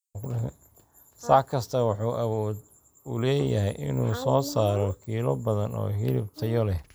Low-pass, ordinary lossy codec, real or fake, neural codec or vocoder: none; none; real; none